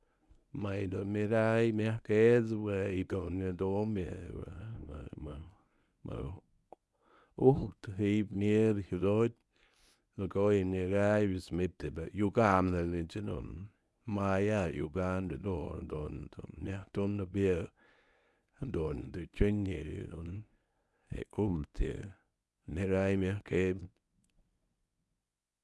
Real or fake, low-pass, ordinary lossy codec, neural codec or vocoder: fake; none; none; codec, 24 kHz, 0.9 kbps, WavTokenizer, medium speech release version 1